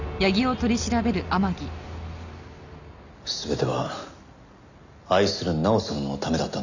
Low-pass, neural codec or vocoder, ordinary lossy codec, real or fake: 7.2 kHz; none; none; real